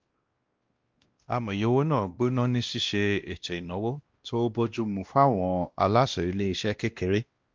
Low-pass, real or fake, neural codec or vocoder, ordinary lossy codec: 7.2 kHz; fake; codec, 16 kHz, 1 kbps, X-Codec, WavLM features, trained on Multilingual LibriSpeech; Opus, 32 kbps